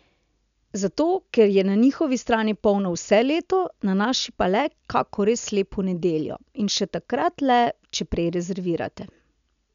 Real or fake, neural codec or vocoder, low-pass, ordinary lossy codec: real; none; 7.2 kHz; none